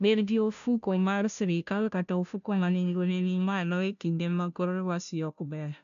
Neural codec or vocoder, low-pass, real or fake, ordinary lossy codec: codec, 16 kHz, 0.5 kbps, FunCodec, trained on Chinese and English, 25 frames a second; 7.2 kHz; fake; none